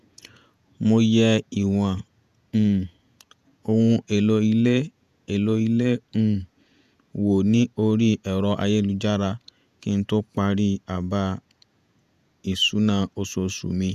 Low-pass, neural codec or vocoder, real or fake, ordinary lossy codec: 14.4 kHz; vocoder, 48 kHz, 128 mel bands, Vocos; fake; none